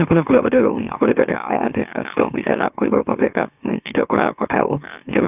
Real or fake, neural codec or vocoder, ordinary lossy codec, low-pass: fake; autoencoder, 44.1 kHz, a latent of 192 numbers a frame, MeloTTS; none; 3.6 kHz